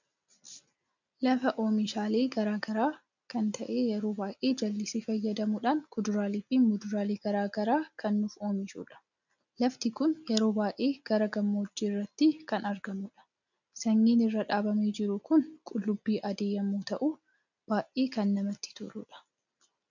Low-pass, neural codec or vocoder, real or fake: 7.2 kHz; none; real